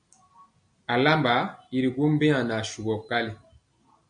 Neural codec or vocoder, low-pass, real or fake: none; 9.9 kHz; real